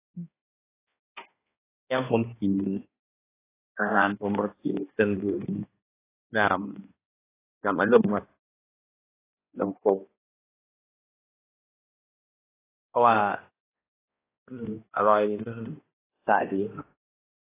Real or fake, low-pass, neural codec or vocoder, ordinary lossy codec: fake; 3.6 kHz; codec, 16 kHz, 1 kbps, X-Codec, HuBERT features, trained on balanced general audio; AAC, 16 kbps